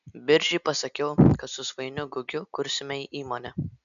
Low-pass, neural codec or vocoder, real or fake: 7.2 kHz; none; real